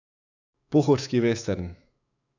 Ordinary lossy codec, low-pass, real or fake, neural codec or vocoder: none; 7.2 kHz; fake; autoencoder, 48 kHz, 128 numbers a frame, DAC-VAE, trained on Japanese speech